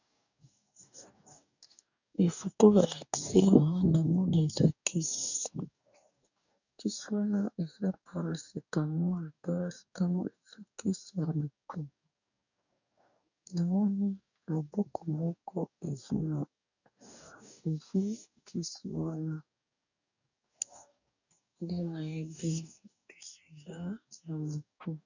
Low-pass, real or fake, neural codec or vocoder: 7.2 kHz; fake; codec, 44.1 kHz, 2.6 kbps, DAC